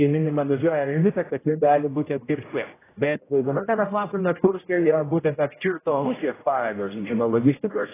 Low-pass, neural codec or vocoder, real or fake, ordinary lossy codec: 3.6 kHz; codec, 16 kHz, 0.5 kbps, X-Codec, HuBERT features, trained on general audio; fake; AAC, 16 kbps